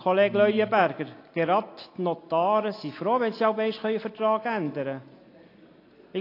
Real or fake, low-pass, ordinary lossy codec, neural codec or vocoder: real; 5.4 kHz; AAC, 32 kbps; none